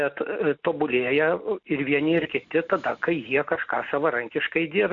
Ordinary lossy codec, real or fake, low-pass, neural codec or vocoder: AAC, 64 kbps; real; 7.2 kHz; none